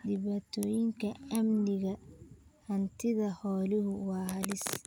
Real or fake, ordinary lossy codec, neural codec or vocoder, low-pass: real; none; none; none